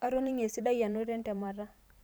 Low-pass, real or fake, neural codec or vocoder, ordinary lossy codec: none; fake; vocoder, 44.1 kHz, 128 mel bands every 512 samples, BigVGAN v2; none